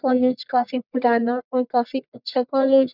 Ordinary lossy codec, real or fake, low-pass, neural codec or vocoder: none; fake; 5.4 kHz; codec, 44.1 kHz, 1.7 kbps, Pupu-Codec